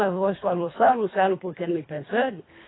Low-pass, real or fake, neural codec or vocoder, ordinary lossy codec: 7.2 kHz; fake; codec, 24 kHz, 1.5 kbps, HILCodec; AAC, 16 kbps